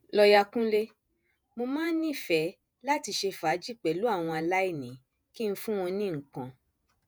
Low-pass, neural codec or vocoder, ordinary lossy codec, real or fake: none; vocoder, 48 kHz, 128 mel bands, Vocos; none; fake